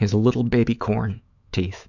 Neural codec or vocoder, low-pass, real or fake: autoencoder, 48 kHz, 128 numbers a frame, DAC-VAE, trained on Japanese speech; 7.2 kHz; fake